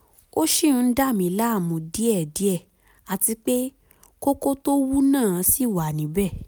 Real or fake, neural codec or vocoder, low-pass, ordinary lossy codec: real; none; none; none